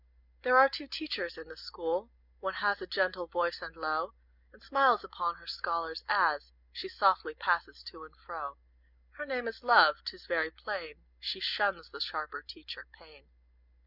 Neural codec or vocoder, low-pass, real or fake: none; 5.4 kHz; real